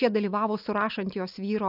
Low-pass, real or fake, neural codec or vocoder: 5.4 kHz; real; none